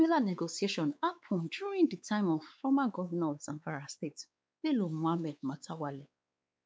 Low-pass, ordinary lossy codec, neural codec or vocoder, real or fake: none; none; codec, 16 kHz, 4 kbps, X-Codec, WavLM features, trained on Multilingual LibriSpeech; fake